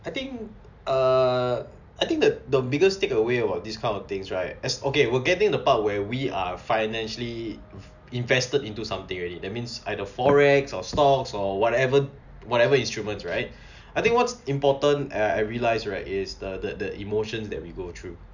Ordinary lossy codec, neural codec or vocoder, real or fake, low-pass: none; none; real; 7.2 kHz